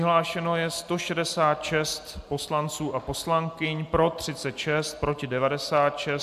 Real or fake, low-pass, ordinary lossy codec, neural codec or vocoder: real; 14.4 kHz; AAC, 96 kbps; none